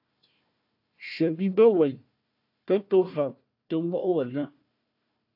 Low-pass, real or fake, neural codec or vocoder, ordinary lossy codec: 5.4 kHz; fake; codec, 16 kHz, 1 kbps, FunCodec, trained on Chinese and English, 50 frames a second; AAC, 48 kbps